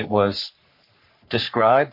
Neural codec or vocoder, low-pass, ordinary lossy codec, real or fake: codec, 44.1 kHz, 3.4 kbps, Pupu-Codec; 5.4 kHz; MP3, 32 kbps; fake